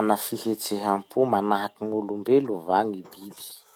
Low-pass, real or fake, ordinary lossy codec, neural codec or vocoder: 19.8 kHz; fake; none; codec, 44.1 kHz, 7.8 kbps, DAC